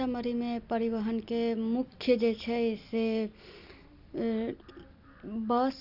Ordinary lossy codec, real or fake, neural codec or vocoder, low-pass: none; real; none; 5.4 kHz